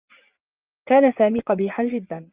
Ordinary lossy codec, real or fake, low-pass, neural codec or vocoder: Opus, 24 kbps; fake; 3.6 kHz; vocoder, 24 kHz, 100 mel bands, Vocos